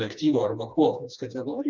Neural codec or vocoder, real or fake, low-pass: codec, 16 kHz, 2 kbps, FreqCodec, smaller model; fake; 7.2 kHz